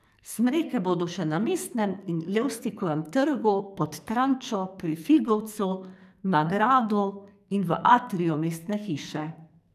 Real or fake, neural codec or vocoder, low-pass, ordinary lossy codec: fake; codec, 44.1 kHz, 2.6 kbps, SNAC; 14.4 kHz; none